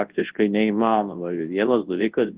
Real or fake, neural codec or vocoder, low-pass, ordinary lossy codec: fake; codec, 24 kHz, 0.9 kbps, WavTokenizer, large speech release; 3.6 kHz; Opus, 16 kbps